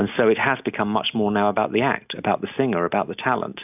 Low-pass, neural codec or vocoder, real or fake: 3.6 kHz; none; real